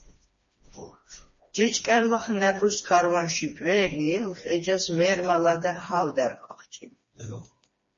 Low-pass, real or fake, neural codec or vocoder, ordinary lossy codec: 7.2 kHz; fake; codec, 16 kHz, 2 kbps, FreqCodec, smaller model; MP3, 32 kbps